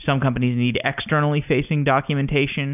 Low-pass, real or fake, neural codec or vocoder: 3.6 kHz; real; none